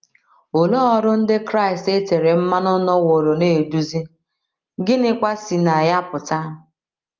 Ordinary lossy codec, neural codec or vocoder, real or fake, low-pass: Opus, 24 kbps; none; real; 7.2 kHz